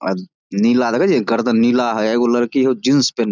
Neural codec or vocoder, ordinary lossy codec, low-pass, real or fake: none; none; 7.2 kHz; real